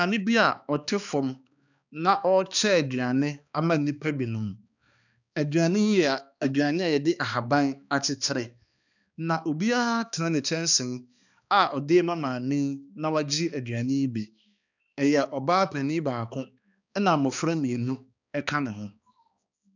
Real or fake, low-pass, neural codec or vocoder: fake; 7.2 kHz; codec, 16 kHz, 2 kbps, X-Codec, HuBERT features, trained on balanced general audio